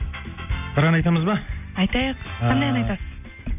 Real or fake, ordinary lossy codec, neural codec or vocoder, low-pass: real; none; none; 3.6 kHz